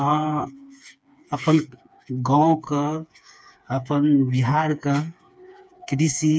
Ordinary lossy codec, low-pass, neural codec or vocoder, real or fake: none; none; codec, 16 kHz, 4 kbps, FreqCodec, smaller model; fake